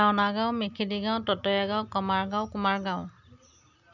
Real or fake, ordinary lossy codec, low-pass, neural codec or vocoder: real; none; 7.2 kHz; none